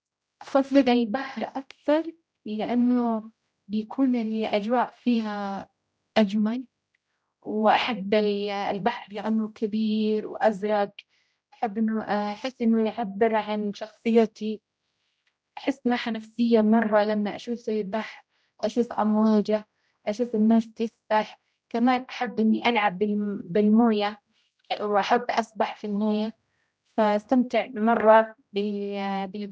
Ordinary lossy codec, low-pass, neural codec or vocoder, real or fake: none; none; codec, 16 kHz, 0.5 kbps, X-Codec, HuBERT features, trained on general audio; fake